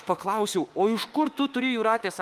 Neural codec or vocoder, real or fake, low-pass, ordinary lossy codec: autoencoder, 48 kHz, 32 numbers a frame, DAC-VAE, trained on Japanese speech; fake; 14.4 kHz; Opus, 32 kbps